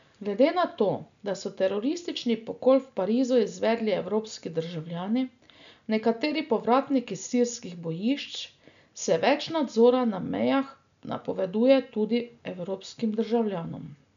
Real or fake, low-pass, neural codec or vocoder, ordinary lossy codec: real; 7.2 kHz; none; none